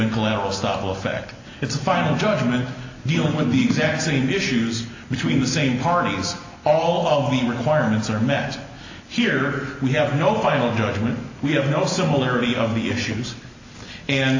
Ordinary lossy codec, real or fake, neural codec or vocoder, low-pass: AAC, 48 kbps; real; none; 7.2 kHz